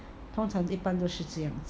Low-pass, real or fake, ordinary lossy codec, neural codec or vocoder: none; real; none; none